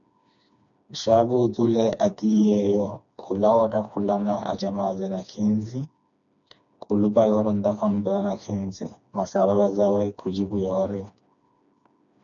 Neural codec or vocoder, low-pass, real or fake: codec, 16 kHz, 2 kbps, FreqCodec, smaller model; 7.2 kHz; fake